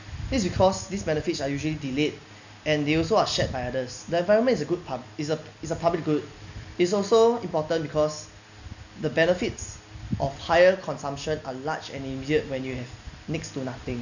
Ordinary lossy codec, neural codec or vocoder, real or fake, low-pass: none; none; real; 7.2 kHz